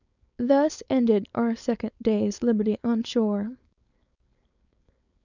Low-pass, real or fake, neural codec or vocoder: 7.2 kHz; fake; codec, 16 kHz, 4.8 kbps, FACodec